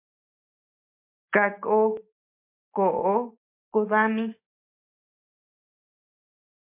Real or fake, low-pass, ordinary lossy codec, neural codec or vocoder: real; 3.6 kHz; MP3, 32 kbps; none